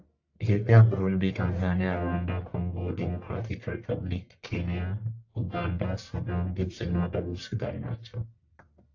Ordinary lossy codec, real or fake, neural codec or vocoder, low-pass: AAC, 48 kbps; fake; codec, 44.1 kHz, 1.7 kbps, Pupu-Codec; 7.2 kHz